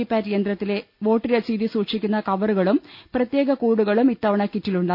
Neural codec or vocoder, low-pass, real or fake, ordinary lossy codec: none; 5.4 kHz; real; none